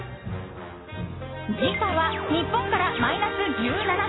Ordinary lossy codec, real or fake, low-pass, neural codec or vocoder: AAC, 16 kbps; real; 7.2 kHz; none